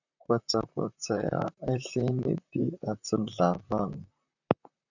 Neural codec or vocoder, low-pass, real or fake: vocoder, 44.1 kHz, 128 mel bands, Pupu-Vocoder; 7.2 kHz; fake